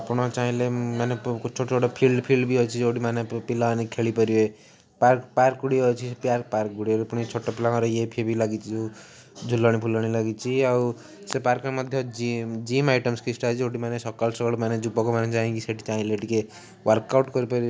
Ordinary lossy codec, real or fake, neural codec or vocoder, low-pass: none; real; none; none